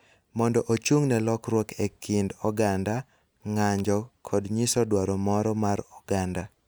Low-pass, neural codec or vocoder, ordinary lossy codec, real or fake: none; none; none; real